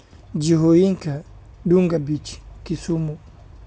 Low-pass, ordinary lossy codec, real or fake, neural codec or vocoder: none; none; real; none